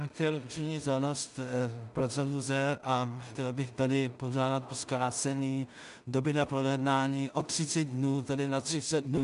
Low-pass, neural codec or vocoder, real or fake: 10.8 kHz; codec, 16 kHz in and 24 kHz out, 0.4 kbps, LongCat-Audio-Codec, two codebook decoder; fake